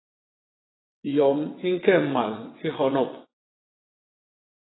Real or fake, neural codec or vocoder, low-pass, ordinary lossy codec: fake; vocoder, 22.05 kHz, 80 mel bands, WaveNeXt; 7.2 kHz; AAC, 16 kbps